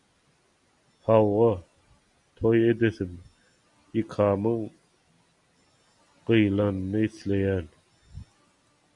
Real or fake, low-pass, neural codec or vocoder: real; 10.8 kHz; none